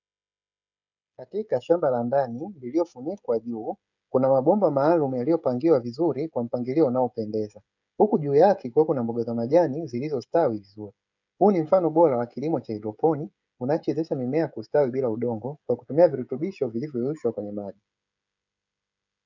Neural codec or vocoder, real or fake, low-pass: codec, 16 kHz, 16 kbps, FreqCodec, smaller model; fake; 7.2 kHz